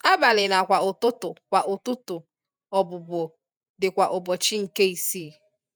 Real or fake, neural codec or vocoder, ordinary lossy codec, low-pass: fake; vocoder, 48 kHz, 128 mel bands, Vocos; none; none